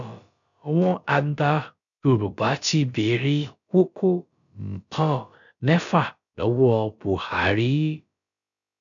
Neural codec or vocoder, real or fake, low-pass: codec, 16 kHz, about 1 kbps, DyCAST, with the encoder's durations; fake; 7.2 kHz